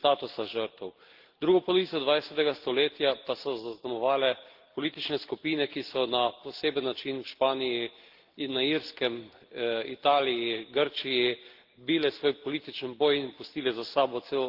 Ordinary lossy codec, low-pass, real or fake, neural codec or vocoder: Opus, 16 kbps; 5.4 kHz; real; none